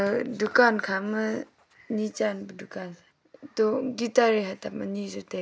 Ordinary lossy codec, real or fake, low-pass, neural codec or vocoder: none; real; none; none